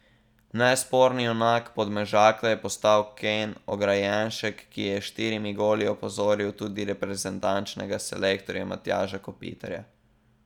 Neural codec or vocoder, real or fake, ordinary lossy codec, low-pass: none; real; none; 19.8 kHz